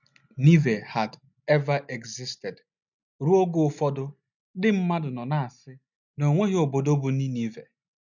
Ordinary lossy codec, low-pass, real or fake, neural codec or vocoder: none; 7.2 kHz; real; none